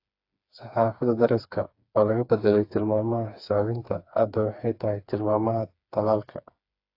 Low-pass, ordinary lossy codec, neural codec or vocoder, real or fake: 5.4 kHz; AAC, 32 kbps; codec, 16 kHz, 4 kbps, FreqCodec, smaller model; fake